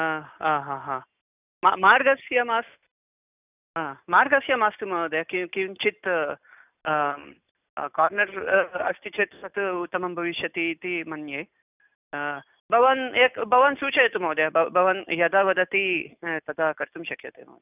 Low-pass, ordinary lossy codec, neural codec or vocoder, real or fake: 3.6 kHz; none; none; real